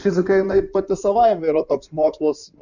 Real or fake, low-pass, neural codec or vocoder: fake; 7.2 kHz; codec, 16 kHz in and 24 kHz out, 2.2 kbps, FireRedTTS-2 codec